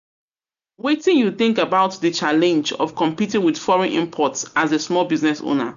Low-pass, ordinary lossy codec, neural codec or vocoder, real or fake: 7.2 kHz; none; none; real